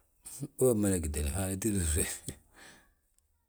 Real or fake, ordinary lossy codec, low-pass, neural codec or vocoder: real; none; none; none